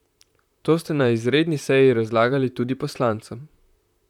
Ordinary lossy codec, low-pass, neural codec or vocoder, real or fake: none; 19.8 kHz; vocoder, 44.1 kHz, 128 mel bands, Pupu-Vocoder; fake